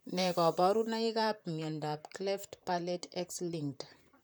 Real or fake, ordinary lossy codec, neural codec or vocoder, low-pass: fake; none; vocoder, 44.1 kHz, 128 mel bands, Pupu-Vocoder; none